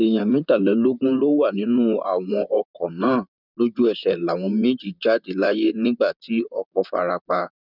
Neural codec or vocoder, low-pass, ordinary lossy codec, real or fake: vocoder, 44.1 kHz, 128 mel bands, Pupu-Vocoder; 5.4 kHz; none; fake